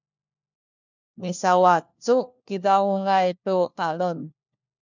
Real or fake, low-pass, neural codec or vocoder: fake; 7.2 kHz; codec, 16 kHz, 1 kbps, FunCodec, trained on LibriTTS, 50 frames a second